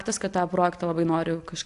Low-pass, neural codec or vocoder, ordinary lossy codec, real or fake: 10.8 kHz; none; AAC, 64 kbps; real